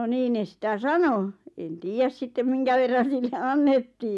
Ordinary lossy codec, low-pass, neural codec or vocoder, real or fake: none; none; none; real